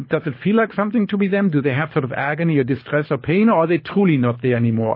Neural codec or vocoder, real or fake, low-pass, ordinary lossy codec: codec, 24 kHz, 6 kbps, HILCodec; fake; 5.4 kHz; MP3, 24 kbps